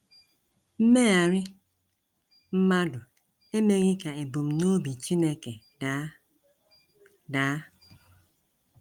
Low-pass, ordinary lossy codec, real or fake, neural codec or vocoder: 19.8 kHz; Opus, 32 kbps; real; none